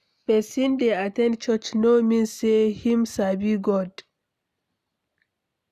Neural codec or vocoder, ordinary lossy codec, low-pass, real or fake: none; none; 14.4 kHz; real